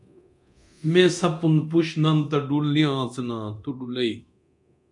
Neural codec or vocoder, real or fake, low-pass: codec, 24 kHz, 0.9 kbps, DualCodec; fake; 10.8 kHz